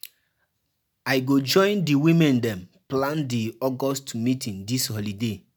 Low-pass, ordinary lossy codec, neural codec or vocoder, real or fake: none; none; none; real